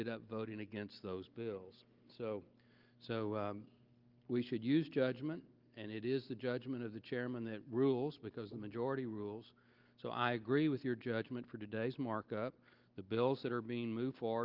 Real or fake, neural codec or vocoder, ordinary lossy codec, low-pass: real; none; Opus, 32 kbps; 5.4 kHz